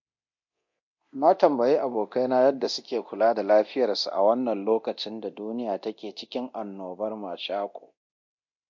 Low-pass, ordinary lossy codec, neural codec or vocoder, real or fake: 7.2 kHz; MP3, 48 kbps; codec, 24 kHz, 0.9 kbps, DualCodec; fake